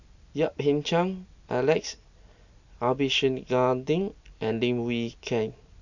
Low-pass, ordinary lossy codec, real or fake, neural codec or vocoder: 7.2 kHz; none; real; none